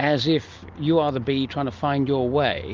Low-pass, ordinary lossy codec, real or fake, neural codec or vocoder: 7.2 kHz; Opus, 32 kbps; real; none